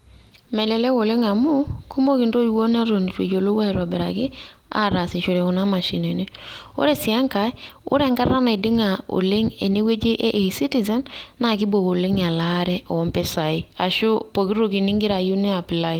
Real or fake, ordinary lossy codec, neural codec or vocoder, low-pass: real; Opus, 24 kbps; none; 19.8 kHz